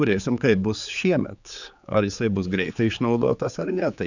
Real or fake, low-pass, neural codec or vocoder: fake; 7.2 kHz; codec, 16 kHz, 4 kbps, X-Codec, HuBERT features, trained on general audio